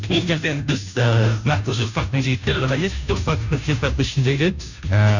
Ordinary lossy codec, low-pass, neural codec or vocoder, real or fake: none; 7.2 kHz; codec, 16 kHz, 0.5 kbps, FunCodec, trained on Chinese and English, 25 frames a second; fake